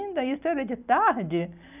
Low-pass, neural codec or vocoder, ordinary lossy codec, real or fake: 3.6 kHz; none; none; real